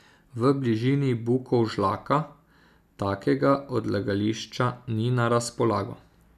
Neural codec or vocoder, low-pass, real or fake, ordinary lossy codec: none; 14.4 kHz; real; none